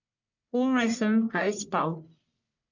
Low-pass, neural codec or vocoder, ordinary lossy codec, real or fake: 7.2 kHz; codec, 44.1 kHz, 1.7 kbps, Pupu-Codec; none; fake